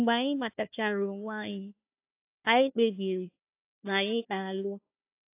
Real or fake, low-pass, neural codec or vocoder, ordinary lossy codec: fake; 3.6 kHz; codec, 16 kHz, 1 kbps, FunCodec, trained on Chinese and English, 50 frames a second; AAC, 24 kbps